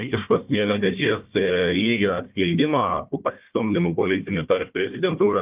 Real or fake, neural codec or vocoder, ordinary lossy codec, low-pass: fake; codec, 16 kHz, 1 kbps, FunCodec, trained on Chinese and English, 50 frames a second; Opus, 24 kbps; 3.6 kHz